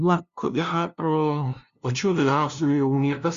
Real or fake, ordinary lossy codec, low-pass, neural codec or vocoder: fake; Opus, 64 kbps; 7.2 kHz; codec, 16 kHz, 0.5 kbps, FunCodec, trained on LibriTTS, 25 frames a second